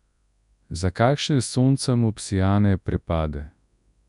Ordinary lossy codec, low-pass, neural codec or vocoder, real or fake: none; 10.8 kHz; codec, 24 kHz, 0.9 kbps, WavTokenizer, large speech release; fake